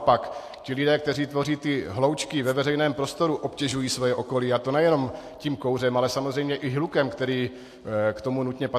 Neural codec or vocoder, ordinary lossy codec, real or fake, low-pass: none; AAC, 64 kbps; real; 14.4 kHz